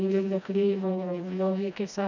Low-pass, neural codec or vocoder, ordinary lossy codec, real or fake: 7.2 kHz; codec, 16 kHz, 1 kbps, FreqCodec, smaller model; none; fake